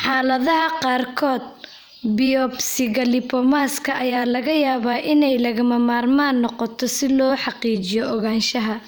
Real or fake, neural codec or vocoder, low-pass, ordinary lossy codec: fake; vocoder, 44.1 kHz, 128 mel bands every 512 samples, BigVGAN v2; none; none